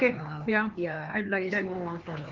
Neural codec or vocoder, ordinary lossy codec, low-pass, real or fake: codec, 16 kHz, 2 kbps, X-Codec, HuBERT features, trained on LibriSpeech; Opus, 24 kbps; 7.2 kHz; fake